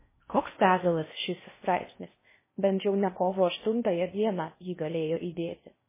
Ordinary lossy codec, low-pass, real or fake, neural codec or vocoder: MP3, 16 kbps; 3.6 kHz; fake; codec, 16 kHz in and 24 kHz out, 0.6 kbps, FocalCodec, streaming, 4096 codes